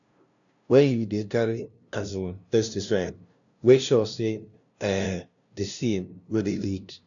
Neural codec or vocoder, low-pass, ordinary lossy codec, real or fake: codec, 16 kHz, 0.5 kbps, FunCodec, trained on LibriTTS, 25 frames a second; 7.2 kHz; none; fake